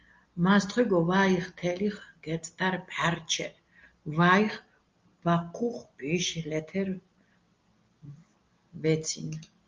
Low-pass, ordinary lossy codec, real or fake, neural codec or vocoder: 7.2 kHz; Opus, 32 kbps; real; none